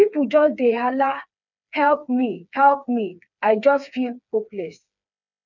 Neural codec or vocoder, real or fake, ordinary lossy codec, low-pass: codec, 16 kHz, 4 kbps, FreqCodec, smaller model; fake; none; 7.2 kHz